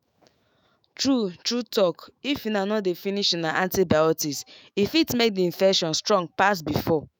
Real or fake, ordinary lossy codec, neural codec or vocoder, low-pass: fake; none; autoencoder, 48 kHz, 128 numbers a frame, DAC-VAE, trained on Japanese speech; none